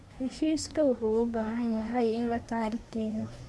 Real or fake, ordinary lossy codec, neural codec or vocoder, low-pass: fake; none; codec, 24 kHz, 1 kbps, SNAC; none